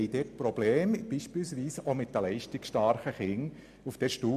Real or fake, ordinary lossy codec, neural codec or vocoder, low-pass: real; MP3, 96 kbps; none; 14.4 kHz